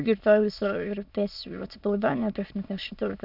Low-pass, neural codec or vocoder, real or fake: 5.4 kHz; autoencoder, 22.05 kHz, a latent of 192 numbers a frame, VITS, trained on many speakers; fake